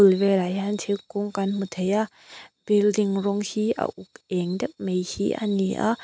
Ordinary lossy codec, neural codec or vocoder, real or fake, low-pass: none; none; real; none